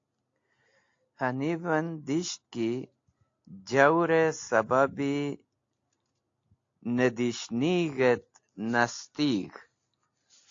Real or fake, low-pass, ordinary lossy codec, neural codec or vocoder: real; 7.2 kHz; AAC, 48 kbps; none